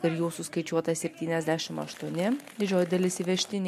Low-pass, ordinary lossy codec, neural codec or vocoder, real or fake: 14.4 kHz; MP3, 64 kbps; none; real